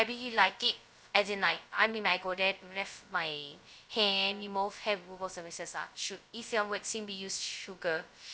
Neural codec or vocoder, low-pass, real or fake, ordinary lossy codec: codec, 16 kHz, 0.2 kbps, FocalCodec; none; fake; none